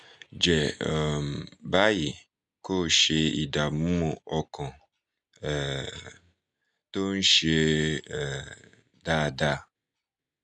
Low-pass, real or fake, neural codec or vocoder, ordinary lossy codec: none; real; none; none